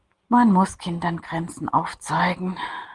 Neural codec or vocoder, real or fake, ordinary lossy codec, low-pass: none; real; Opus, 32 kbps; 10.8 kHz